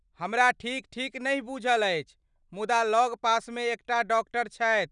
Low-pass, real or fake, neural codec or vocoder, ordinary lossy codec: 9.9 kHz; real; none; none